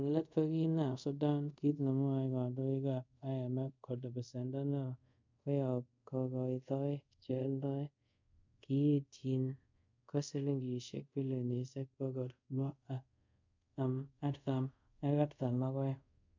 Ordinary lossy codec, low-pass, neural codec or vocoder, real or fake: MP3, 64 kbps; 7.2 kHz; codec, 24 kHz, 0.5 kbps, DualCodec; fake